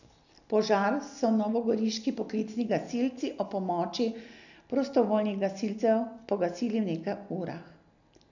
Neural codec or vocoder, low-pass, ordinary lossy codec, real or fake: none; 7.2 kHz; none; real